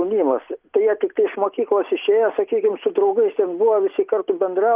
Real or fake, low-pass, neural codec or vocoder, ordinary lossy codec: real; 3.6 kHz; none; Opus, 32 kbps